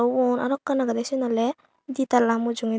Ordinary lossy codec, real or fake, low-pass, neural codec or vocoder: none; real; none; none